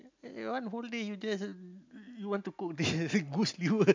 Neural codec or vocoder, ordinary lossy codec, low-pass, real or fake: none; MP3, 64 kbps; 7.2 kHz; real